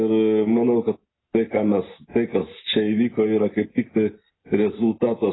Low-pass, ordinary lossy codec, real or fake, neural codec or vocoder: 7.2 kHz; AAC, 16 kbps; real; none